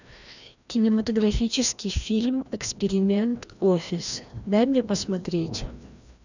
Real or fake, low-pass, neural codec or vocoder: fake; 7.2 kHz; codec, 16 kHz, 1 kbps, FreqCodec, larger model